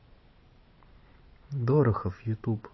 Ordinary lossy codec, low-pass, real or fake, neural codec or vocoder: MP3, 24 kbps; 7.2 kHz; fake; vocoder, 44.1 kHz, 128 mel bands every 512 samples, BigVGAN v2